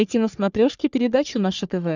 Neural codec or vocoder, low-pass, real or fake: codec, 44.1 kHz, 3.4 kbps, Pupu-Codec; 7.2 kHz; fake